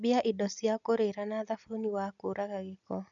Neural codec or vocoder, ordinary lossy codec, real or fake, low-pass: none; none; real; 7.2 kHz